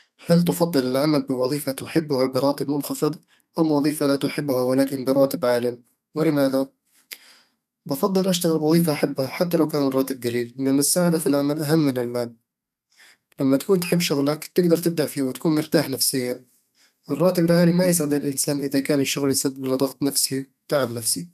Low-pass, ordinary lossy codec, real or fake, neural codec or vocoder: 14.4 kHz; none; fake; codec, 32 kHz, 1.9 kbps, SNAC